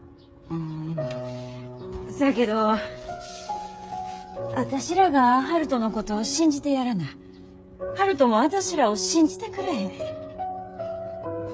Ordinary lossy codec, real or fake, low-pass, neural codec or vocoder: none; fake; none; codec, 16 kHz, 8 kbps, FreqCodec, smaller model